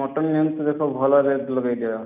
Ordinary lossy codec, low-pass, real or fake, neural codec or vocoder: none; 3.6 kHz; real; none